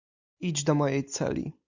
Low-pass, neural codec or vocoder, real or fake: 7.2 kHz; none; real